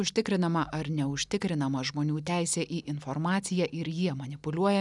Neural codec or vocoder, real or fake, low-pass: none; real; 10.8 kHz